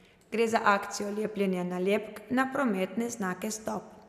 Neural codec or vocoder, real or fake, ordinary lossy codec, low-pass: none; real; none; 14.4 kHz